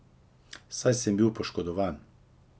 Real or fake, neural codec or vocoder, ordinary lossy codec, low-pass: real; none; none; none